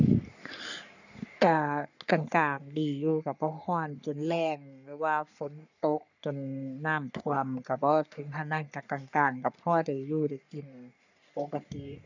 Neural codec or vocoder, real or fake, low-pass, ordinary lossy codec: codec, 44.1 kHz, 3.4 kbps, Pupu-Codec; fake; 7.2 kHz; none